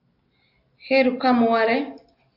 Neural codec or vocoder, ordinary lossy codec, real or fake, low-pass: none; AAC, 32 kbps; real; 5.4 kHz